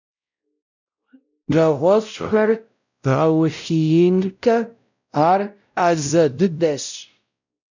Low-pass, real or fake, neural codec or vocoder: 7.2 kHz; fake; codec, 16 kHz, 0.5 kbps, X-Codec, WavLM features, trained on Multilingual LibriSpeech